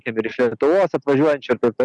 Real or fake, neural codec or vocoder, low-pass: real; none; 10.8 kHz